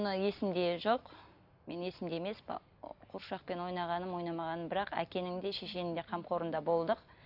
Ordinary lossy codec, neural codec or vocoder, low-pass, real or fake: none; none; 5.4 kHz; real